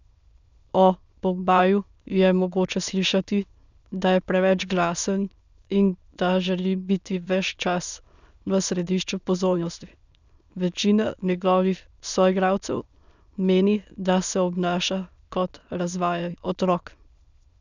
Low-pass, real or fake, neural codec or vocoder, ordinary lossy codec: 7.2 kHz; fake; autoencoder, 22.05 kHz, a latent of 192 numbers a frame, VITS, trained on many speakers; none